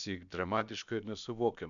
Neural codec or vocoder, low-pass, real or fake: codec, 16 kHz, about 1 kbps, DyCAST, with the encoder's durations; 7.2 kHz; fake